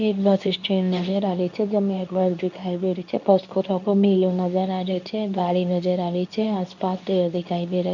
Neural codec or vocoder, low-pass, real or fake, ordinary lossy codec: codec, 24 kHz, 0.9 kbps, WavTokenizer, medium speech release version 2; 7.2 kHz; fake; none